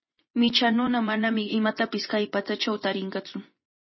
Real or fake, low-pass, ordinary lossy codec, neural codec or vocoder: fake; 7.2 kHz; MP3, 24 kbps; vocoder, 24 kHz, 100 mel bands, Vocos